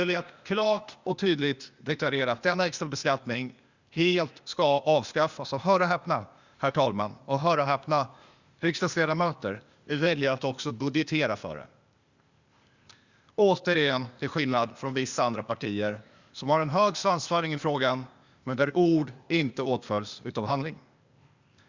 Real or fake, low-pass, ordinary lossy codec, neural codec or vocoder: fake; 7.2 kHz; Opus, 64 kbps; codec, 16 kHz, 0.8 kbps, ZipCodec